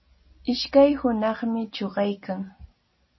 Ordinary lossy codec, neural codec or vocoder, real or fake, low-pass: MP3, 24 kbps; none; real; 7.2 kHz